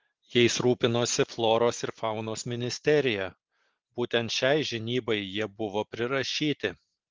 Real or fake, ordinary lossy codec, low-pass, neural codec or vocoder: real; Opus, 16 kbps; 7.2 kHz; none